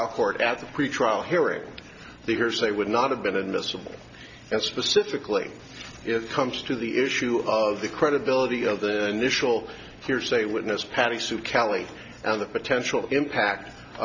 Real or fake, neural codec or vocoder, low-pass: real; none; 7.2 kHz